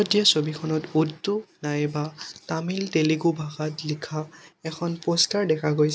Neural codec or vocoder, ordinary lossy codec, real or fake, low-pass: none; none; real; none